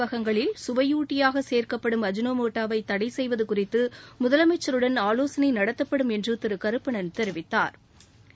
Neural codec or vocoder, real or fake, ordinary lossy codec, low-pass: none; real; none; none